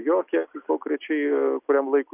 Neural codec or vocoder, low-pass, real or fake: none; 3.6 kHz; real